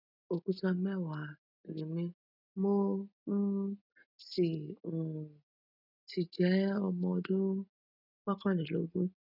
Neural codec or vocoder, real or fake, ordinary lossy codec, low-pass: none; real; none; 5.4 kHz